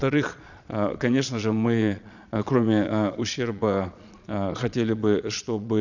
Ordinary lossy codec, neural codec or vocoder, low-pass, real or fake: none; vocoder, 22.05 kHz, 80 mel bands, Vocos; 7.2 kHz; fake